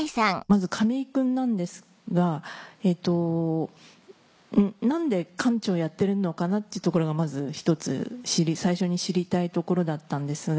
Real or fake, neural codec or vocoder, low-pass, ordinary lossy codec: real; none; none; none